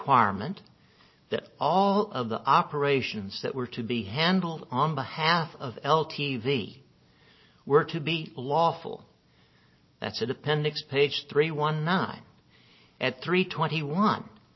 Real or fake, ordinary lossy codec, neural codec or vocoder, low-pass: real; MP3, 24 kbps; none; 7.2 kHz